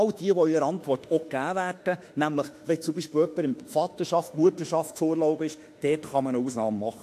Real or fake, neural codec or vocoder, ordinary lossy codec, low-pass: fake; autoencoder, 48 kHz, 32 numbers a frame, DAC-VAE, trained on Japanese speech; AAC, 64 kbps; 14.4 kHz